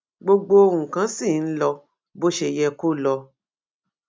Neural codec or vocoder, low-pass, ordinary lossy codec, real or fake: none; none; none; real